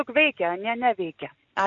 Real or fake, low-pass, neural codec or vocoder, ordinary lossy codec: real; 7.2 kHz; none; MP3, 96 kbps